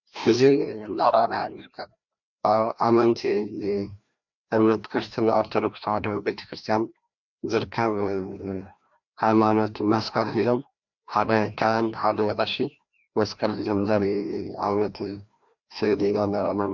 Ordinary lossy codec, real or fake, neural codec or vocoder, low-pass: MP3, 64 kbps; fake; codec, 16 kHz, 1 kbps, FreqCodec, larger model; 7.2 kHz